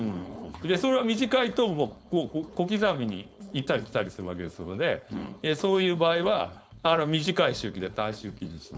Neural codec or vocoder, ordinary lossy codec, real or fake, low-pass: codec, 16 kHz, 4.8 kbps, FACodec; none; fake; none